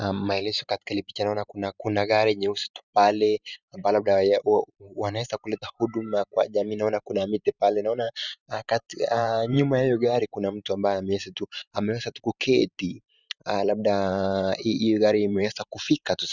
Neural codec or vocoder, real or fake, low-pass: vocoder, 44.1 kHz, 128 mel bands every 512 samples, BigVGAN v2; fake; 7.2 kHz